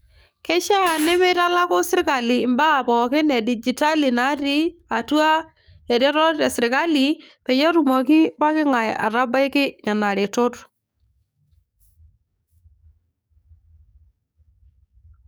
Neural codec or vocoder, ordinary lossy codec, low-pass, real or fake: codec, 44.1 kHz, 7.8 kbps, DAC; none; none; fake